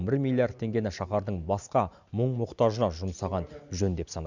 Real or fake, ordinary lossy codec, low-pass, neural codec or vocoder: real; none; 7.2 kHz; none